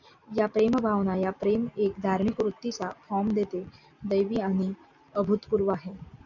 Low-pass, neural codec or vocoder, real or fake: 7.2 kHz; none; real